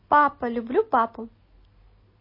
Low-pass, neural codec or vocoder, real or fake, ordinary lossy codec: 5.4 kHz; none; real; MP3, 24 kbps